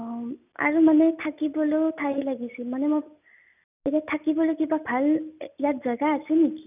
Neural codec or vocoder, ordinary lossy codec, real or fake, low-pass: none; none; real; 3.6 kHz